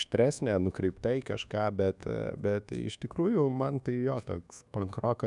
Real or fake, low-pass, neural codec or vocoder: fake; 10.8 kHz; codec, 24 kHz, 1.2 kbps, DualCodec